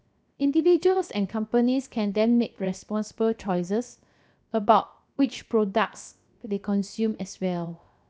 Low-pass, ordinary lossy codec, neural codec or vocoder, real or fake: none; none; codec, 16 kHz, 0.7 kbps, FocalCodec; fake